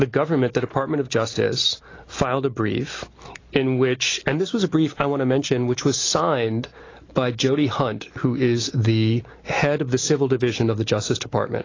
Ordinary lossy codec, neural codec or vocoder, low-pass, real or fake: AAC, 32 kbps; none; 7.2 kHz; real